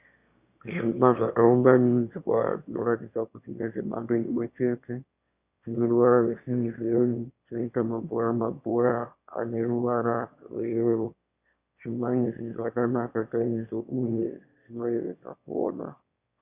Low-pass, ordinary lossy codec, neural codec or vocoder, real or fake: 3.6 kHz; Opus, 64 kbps; autoencoder, 22.05 kHz, a latent of 192 numbers a frame, VITS, trained on one speaker; fake